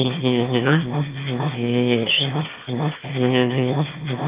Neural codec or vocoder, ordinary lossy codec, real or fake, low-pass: autoencoder, 22.05 kHz, a latent of 192 numbers a frame, VITS, trained on one speaker; Opus, 32 kbps; fake; 3.6 kHz